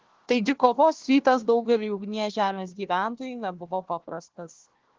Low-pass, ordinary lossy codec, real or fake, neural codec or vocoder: 7.2 kHz; Opus, 16 kbps; fake; codec, 16 kHz, 1 kbps, FunCodec, trained on Chinese and English, 50 frames a second